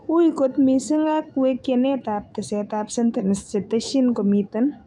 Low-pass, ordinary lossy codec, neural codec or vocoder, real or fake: 10.8 kHz; none; autoencoder, 48 kHz, 128 numbers a frame, DAC-VAE, trained on Japanese speech; fake